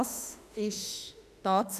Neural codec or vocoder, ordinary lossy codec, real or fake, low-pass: autoencoder, 48 kHz, 32 numbers a frame, DAC-VAE, trained on Japanese speech; none; fake; 14.4 kHz